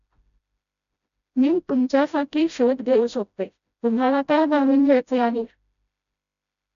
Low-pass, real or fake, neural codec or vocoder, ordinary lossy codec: 7.2 kHz; fake; codec, 16 kHz, 0.5 kbps, FreqCodec, smaller model; none